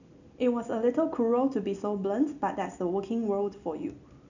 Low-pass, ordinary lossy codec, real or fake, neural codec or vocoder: 7.2 kHz; none; real; none